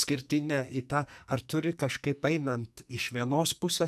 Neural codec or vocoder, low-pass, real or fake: codec, 44.1 kHz, 2.6 kbps, SNAC; 14.4 kHz; fake